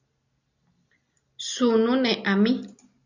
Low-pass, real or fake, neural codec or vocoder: 7.2 kHz; real; none